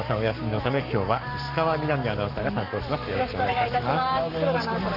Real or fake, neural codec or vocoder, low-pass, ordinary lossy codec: fake; codec, 44.1 kHz, 7.8 kbps, Pupu-Codec; 5.4 kHz; none